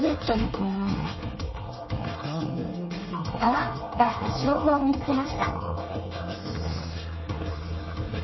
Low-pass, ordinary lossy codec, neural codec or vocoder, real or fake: 7.2 kHz; MP3, 24 kbps; codec, 24 kHz, 1 kbps, SNAC; fake